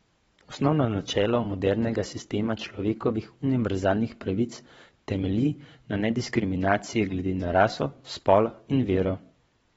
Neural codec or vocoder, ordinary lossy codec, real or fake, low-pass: vocoder, 22.05 kHz, 80 mel bands, WaveNeXt; AAC, 24 kbps; fake; 9.9 kHz